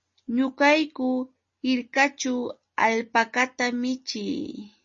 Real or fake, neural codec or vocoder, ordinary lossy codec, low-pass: real; none; MP3, 32 kbps; 7.2 kHz